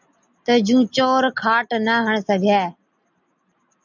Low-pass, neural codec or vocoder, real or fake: 7.2 kHz; none; real